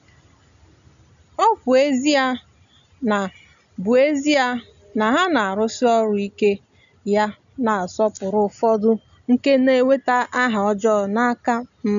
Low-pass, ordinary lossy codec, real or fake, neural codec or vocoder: 7.2 kHz; none; real; none